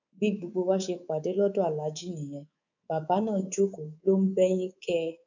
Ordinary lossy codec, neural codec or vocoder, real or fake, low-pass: none; codec, 24 kHz, 3.1 kbps, DualCodec; fake; 7.2 kHz